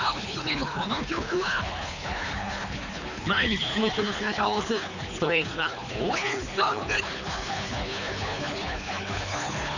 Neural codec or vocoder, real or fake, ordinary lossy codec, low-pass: codec, 24 kHz, 3 kbps, HILCodec; fake; none; 7.2 kHz